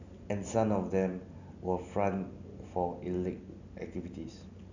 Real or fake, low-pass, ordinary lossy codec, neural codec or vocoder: real; 7.2 kHz; none; none